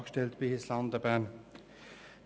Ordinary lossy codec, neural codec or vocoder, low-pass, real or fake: none; none; none; real